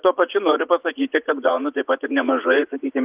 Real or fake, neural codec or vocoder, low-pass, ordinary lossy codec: fake; vocoder, 44.1 kHz, 80 mel bands, Vocos; 3.6 kHz; Opus, 24 kbps